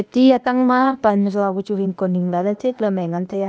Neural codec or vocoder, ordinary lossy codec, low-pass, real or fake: codec, 16 kHz, 0.8 kbps, ZipCodec; none; none; fake